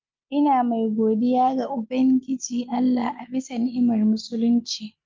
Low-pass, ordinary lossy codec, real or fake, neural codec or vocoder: 7.2 kHz; Opus, 32 kbps; real; none